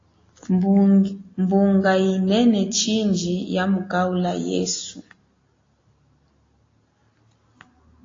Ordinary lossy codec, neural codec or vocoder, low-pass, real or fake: AAC, 32 kbps; none; 7.2 kHz; real